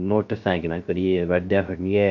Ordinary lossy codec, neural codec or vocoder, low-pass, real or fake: MP3, 48 kbps; codec, 16 kHz, 0.3 kbps, FocalCodec; 7.2 kHz; fake